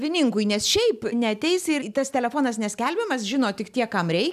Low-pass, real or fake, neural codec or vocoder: 14.4 kHz; real; none